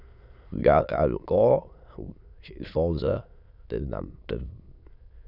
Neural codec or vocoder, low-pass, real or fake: autoencoder, 22.05 kHz, a latent of 192 numbers a frame, VITS, trained on many speakers; 5.4 kHz; fake